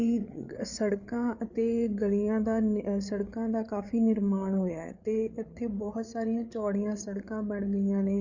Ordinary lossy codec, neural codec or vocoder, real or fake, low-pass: none; codec, 16 kHz, 8 kbps, FreqCodec, larger model; fake; 7.2 kHz